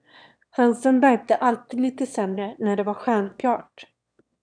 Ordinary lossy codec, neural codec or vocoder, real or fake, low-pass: MP3, 96 kbps; autoencoder, 22.05 kHz, a latent of 192 numbers a frame, VITS, trained on one speaker; fake; 9.9 kHz